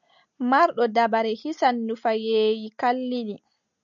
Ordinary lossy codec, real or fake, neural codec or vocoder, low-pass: MP3, 96 kbps; real; none; 7.2 kHz